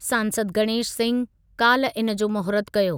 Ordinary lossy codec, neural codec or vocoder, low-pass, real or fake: none; none; none; real